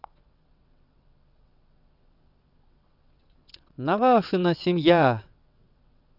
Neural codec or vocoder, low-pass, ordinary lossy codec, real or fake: vocoder, 22.05 kHz, 80 mel bands, WaveNeXt; 5.4 kHz; none; fake